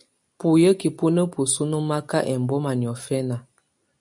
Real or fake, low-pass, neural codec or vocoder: real; 10.8 kHz; none